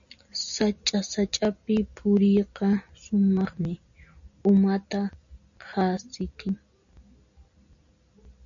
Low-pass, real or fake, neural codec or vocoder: 7.2 kHz; real; none